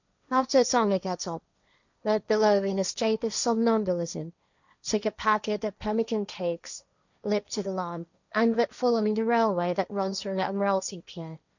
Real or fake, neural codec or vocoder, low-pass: fake; codec, 16 kHz, 1.1 kbps, Voila-Tokenizer; 7.2 kHz